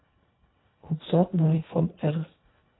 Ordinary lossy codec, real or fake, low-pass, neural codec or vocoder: AAC, 16 kbps; fake; 7.2 kHz; codec, 24 kHz, 1.5 kbps, HILCodec